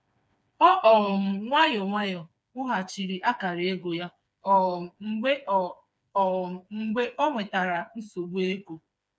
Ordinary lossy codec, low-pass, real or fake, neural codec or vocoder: none; none; fake; codec, 16 kHz, 4 kbps, FreqCodec, smaller model